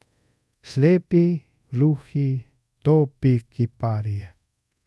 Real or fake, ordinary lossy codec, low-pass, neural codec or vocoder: fake; none; none; codec, 24 kHz, 0.5 kbps, DualCodec